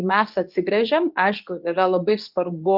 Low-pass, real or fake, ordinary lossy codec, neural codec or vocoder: 5.4 kHz; fake; Opus, 24 kbps; codec, 24 kHz, 0.9 kbps, WavTokenizer, medium speech release version 2